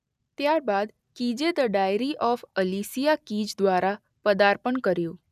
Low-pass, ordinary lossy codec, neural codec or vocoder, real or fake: 14.4 kHz; none; none; real